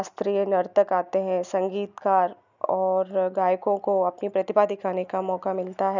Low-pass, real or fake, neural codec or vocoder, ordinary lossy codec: 7.2 kHz; real; none; none